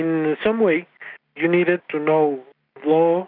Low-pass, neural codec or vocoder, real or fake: 5.4 kHz; none; real